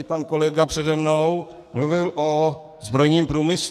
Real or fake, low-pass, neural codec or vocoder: fake; 14.4 kHz; codec, 44.1 kHz, 2.6 kbps, SNAC